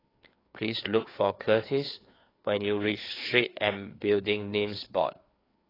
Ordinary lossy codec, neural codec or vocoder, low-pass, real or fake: AAC, 24 kbps; codec, 16 kHz, 4 kbps, FreqCodec, larger model; 5.4 kHz; fake